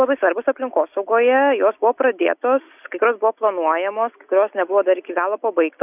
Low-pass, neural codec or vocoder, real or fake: 3.6 kHz; none; real